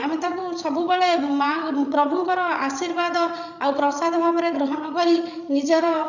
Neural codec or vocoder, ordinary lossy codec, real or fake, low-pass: codec, 16 kHz, 8 kbps, FreqCodec, larger model; none; fake; 7.2 kHz